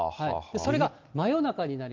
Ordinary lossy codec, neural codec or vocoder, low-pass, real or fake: Opus, 24 kbps; none; 7.2 kHz; real